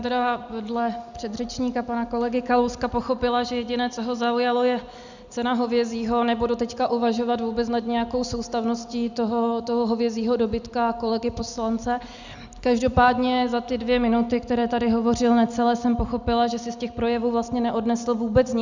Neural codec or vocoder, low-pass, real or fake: none; 7.2 kHz; real